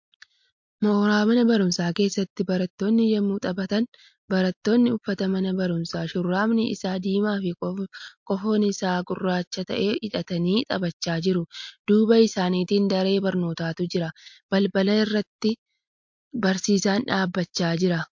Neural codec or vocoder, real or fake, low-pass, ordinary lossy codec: none; real; 7.2 kHz; MP3, 48 kbps